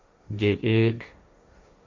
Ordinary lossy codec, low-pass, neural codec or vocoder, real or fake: MP3, 48 kbps; 7.2 kHz; codec, 16 kHz, 1.1 kbps, Voila-Tokenizer; fake